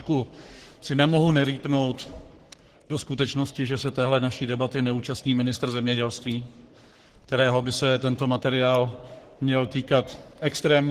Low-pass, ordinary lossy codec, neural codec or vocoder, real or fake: 14.4 kHz; Opus, 16 kbps; codec, 44.1 kHz, 3.4 kbps, Pupu-Codec; fake